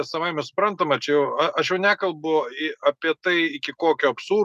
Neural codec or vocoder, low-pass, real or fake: none; 14.4 kHz; real